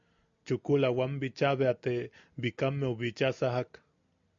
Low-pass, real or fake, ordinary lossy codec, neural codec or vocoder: 7.2 kHz; real; MP3, 64 kbps; none